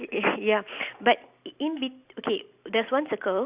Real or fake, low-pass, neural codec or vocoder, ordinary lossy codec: real; 3.6 kHz; none; Opus, 64 kbps